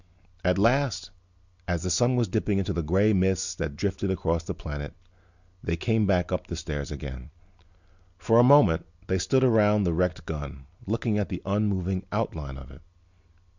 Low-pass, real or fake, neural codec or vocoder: 7.2 kHz; real; none